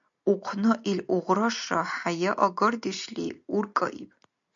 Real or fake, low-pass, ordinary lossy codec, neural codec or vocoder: real; 7.2 kHz; MP3, 64 kbps; none